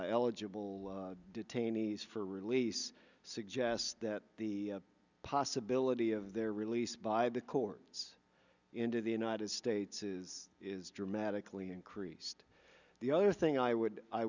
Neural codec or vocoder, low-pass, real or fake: none; 7.2 kHz; real